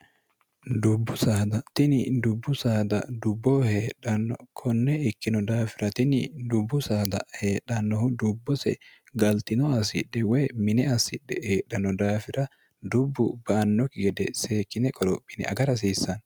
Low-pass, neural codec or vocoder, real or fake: 19.8 kHz; none; real